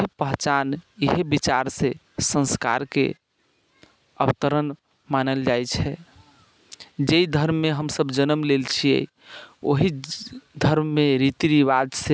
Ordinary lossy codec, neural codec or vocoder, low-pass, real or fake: none; none; none; real